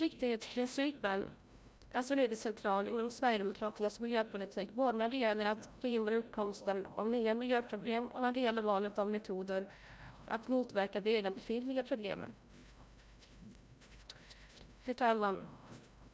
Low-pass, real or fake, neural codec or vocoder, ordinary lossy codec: none; fake; codec, 16 kHz, 0.5 kbps, FreqCodec, larger model; none